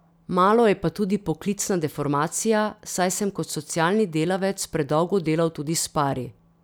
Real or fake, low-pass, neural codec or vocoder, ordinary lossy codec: real; none; none; none